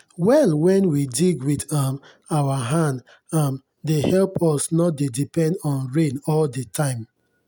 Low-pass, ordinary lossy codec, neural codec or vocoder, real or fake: none; none; none; real